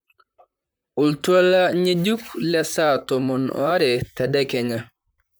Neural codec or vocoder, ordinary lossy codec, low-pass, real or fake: vocoder, 44.1 kHz, 128 mel bands, Pupu-Vocoder; none; none; fake